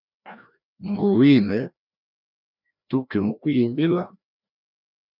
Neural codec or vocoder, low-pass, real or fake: codec, 16 kHz, 1 kbps, FreqCodec, larger model; 5.4 kHz; fake